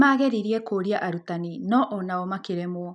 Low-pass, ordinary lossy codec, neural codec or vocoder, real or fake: 10.8 kHz; none; none; real